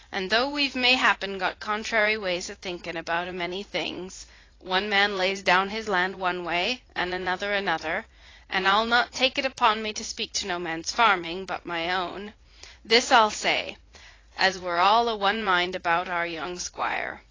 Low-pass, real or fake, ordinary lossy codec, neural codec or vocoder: 7.2 kHz; fake; AAC, 32 kbps; vocoder, 44.1 kHz, 80 mel bands, Vocos